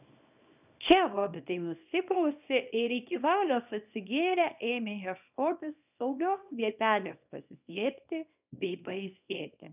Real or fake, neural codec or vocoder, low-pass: fake; codec, 24 kHz, 0.9 kbps, WavTokenizer, small release; 3.6 kHz